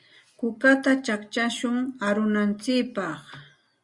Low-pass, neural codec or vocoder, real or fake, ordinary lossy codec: 10.8 kHz; none; real; Opus, 64 kbps